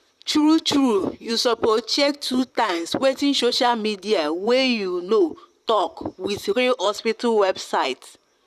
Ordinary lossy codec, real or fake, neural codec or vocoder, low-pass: none; fake; vocoder, 44.1 kHz, 128 mel bands, Pupu-Vocoder; 14.4 kHz